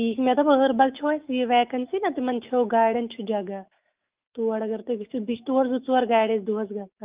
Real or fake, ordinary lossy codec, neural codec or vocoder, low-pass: fake; Opus, 32 kbps; autoencoder, 48 kHz, 128 numbers a frame, DAC-VAE, trained on Japanese speech; 3.6 kHz